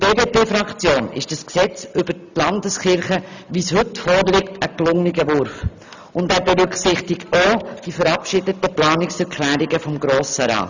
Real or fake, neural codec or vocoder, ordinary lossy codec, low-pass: real; none; none; 7.2 kHz